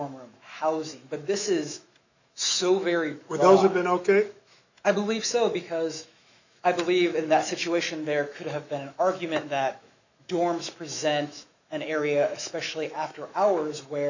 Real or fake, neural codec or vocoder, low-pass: fake; autoencoder, 48 kHz, 128 numbers a frame, DAC-VAE, trained on Japanese speech; 7.2 kHz